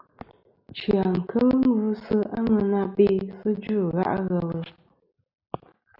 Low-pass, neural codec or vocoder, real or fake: 5.4 kHz; none; real